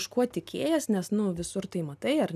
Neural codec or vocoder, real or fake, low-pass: none; real; 14.4 kHz